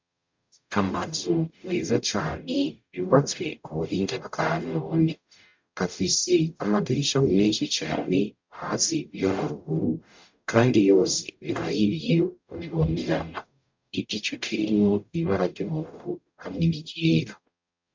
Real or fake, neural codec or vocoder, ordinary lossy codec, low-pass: fake; codec, 44.1 kHz, 0.9 kbps, DAC; MP3, 64 kbps; 7.2 kHz